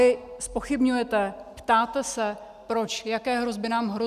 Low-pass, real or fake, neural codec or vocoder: 14.4 kHz; real; none